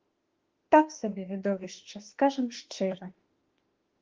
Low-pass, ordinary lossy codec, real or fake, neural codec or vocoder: 7.2 kHz; Opus, 16 kbps; fake; autoencoder, 48 kHz, 32 numbers a frame, DAC-VAE, trained on Japanese speech